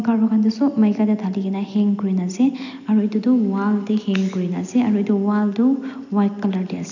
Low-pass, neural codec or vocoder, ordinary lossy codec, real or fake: 7.2 kHz; none; none; real